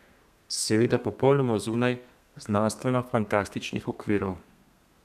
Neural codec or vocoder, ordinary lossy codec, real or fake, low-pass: codec, 32 kHz, 1.9 kbps, SNAC; none; fake; 14.4 kHz